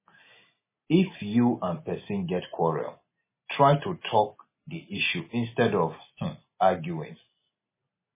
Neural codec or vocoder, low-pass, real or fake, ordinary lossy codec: none; 3.6 kHz; real; MP3, 16 kbps